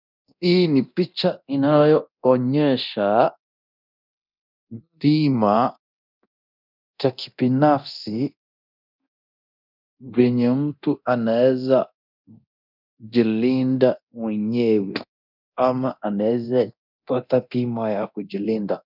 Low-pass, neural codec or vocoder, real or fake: 5.4 kHz; codec, 24 kHz, 0.9 kbps, DualCodec; fake